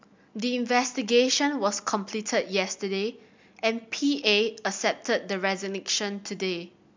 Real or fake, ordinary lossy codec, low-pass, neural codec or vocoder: real; MP3, 64 kbps; 7.2 kHz; none